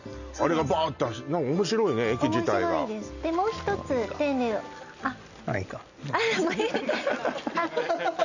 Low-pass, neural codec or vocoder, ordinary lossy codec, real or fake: 7.2 kHz; none; none; real